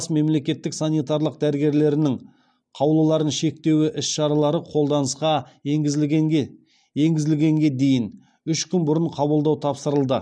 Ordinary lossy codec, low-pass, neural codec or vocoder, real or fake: none; 9.9 kHz; none; real